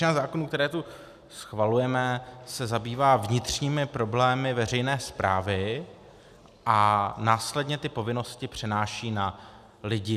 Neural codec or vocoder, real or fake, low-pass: none; real; 14.4 kHz